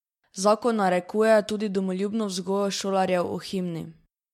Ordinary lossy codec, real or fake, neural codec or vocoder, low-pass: MP3, 64 kbps; real; none; 19.8 kHz